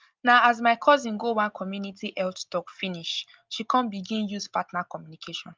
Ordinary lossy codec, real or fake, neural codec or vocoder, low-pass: Opus, 24 kbps; real; none; 7.2 kHz